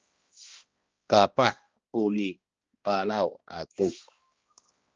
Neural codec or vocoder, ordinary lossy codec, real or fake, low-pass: codec, 16 kHz, 1 kbps, X-Codec, HuBERT features, trained on balanced general audio; Opus, 24 kbps; fake; 7.2 kHz